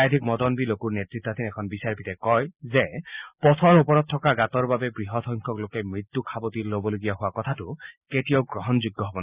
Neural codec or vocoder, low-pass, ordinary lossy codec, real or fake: none; 3.6 kHz; Opus, 64 kbps; real